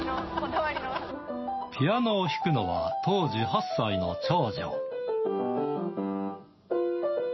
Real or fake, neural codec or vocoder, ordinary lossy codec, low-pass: real; none; MP3, 24 kbps; 7.2 kHz